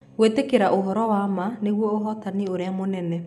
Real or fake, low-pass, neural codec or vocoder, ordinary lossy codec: fake; 14.4 kHz; vocoder, 44.1 kHz, 128 mel bands every 256 samples, BigVGAN v2; none